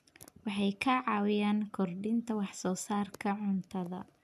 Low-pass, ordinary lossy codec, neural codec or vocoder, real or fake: 14.4 kHz; MP3, 96 kbps; none; real